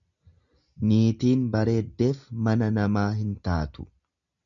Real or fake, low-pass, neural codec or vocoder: real; 7.2 kHz; none